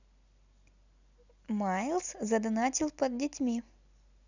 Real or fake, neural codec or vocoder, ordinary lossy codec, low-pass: real; none; none; 7.2 kHz